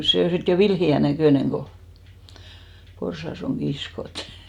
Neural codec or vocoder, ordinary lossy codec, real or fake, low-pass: none; none; real; 19.8 kHz